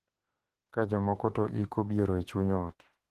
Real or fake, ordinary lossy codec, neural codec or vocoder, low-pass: fake; Opus, 16 kbps; autoencoder, 48 kHz, 32 numbers a frame, DAC-VAE, trained on Japanese speech; 14.4 kHz